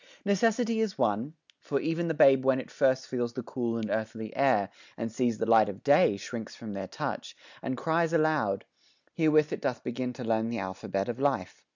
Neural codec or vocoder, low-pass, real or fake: none; 7.2 kHz; real